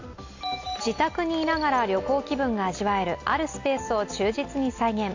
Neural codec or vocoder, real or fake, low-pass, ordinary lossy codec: none; real; 7.2 kHz; AAC, 48 kbps